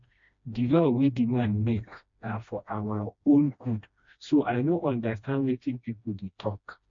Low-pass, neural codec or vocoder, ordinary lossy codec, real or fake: 7.2 kHz; codec, 16 kHz, 1 kbps, FreqCodec, smaller model; MP3, 48 kbps; fake